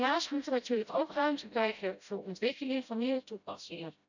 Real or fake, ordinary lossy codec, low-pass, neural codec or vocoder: fake; none; 7.2 kHz; codec, 16 kHz, 0.5 kbps, FreqCodec, smaller model